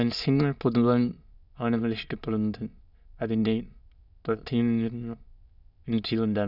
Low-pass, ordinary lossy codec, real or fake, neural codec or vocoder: 5.4 kHz; none; fake; autoencoder, 22.05 kHz, a latent of 192 numbers a frame, VITS, trained on many speakers